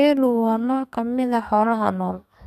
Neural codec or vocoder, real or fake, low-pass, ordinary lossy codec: codec, 32 kHz, 1.9 kbps, SNAC; fake; 14.4 kHz; none